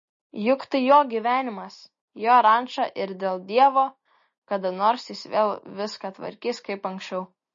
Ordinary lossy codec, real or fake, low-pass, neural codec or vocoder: MP3, 32 kbps; real; 7.2 kHz; none